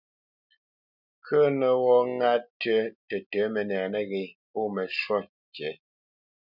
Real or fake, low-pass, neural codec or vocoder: real; 5.4 kHz; none